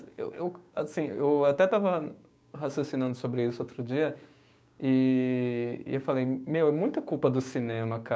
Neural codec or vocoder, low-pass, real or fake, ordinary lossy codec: codec, 16 kHz, 6 kbps, DAC; none; fake; none